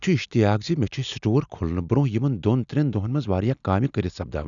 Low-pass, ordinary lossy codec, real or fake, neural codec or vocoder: 7.2 kHz; none; real; none